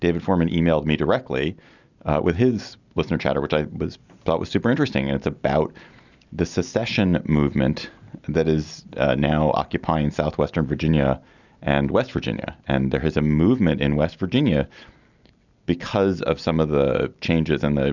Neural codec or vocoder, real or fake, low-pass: none; real; 7.2 kHz